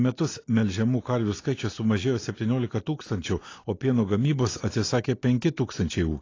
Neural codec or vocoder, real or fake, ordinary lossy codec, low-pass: none; real; AAC, 32 kbps; 7.2 kHz